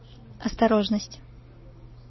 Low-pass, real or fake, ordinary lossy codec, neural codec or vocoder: 7.2 kHz; real; MP3, 24 kbps; none